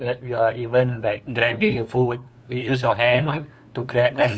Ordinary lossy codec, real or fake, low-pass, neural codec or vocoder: none; fake; none; codec, 16 kHz, 2 kbps, FunCodec, trained on LibriTTS, 25 frames a second